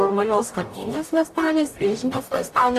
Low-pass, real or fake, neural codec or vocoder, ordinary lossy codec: 14.4 kHz; fake; codec, 44.1 kHz, 0.9 kbps, DAC; AAC, 64 kbps